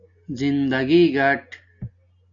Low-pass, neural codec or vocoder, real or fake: 7.2 kHz; none; real